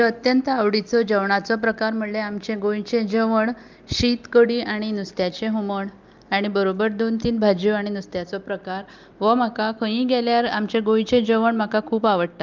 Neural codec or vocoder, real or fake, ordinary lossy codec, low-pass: none; real; Opus, 24 kbps; 7.2 kHz